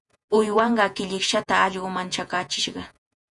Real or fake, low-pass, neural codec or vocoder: fake; 10.8 kHz; vocoder, 48 kHz, 128 mel bands, Vocos